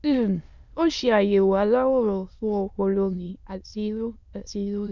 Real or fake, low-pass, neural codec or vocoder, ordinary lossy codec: fake; 7.2 kHz; autoencoder, 22.05 kHz, a latent of 192 numbers a frame, VITS, trained on many speakers; none